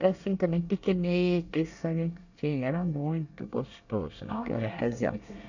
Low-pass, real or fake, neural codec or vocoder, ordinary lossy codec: 7.2 kHz; fake; codec, 24 kHz, 1 kbps, SNAC; none